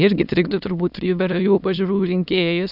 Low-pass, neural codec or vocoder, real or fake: 5.4 kHz; autoencoder, 22.05 kHz, a latent of 192 numbers a frame, VITS, trained on many speakers; fake